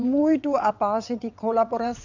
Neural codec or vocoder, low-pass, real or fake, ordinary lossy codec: vocoder, 22.05 kHz, 80 mel bands, WaveNeXt; 7.2 kHz; fake; none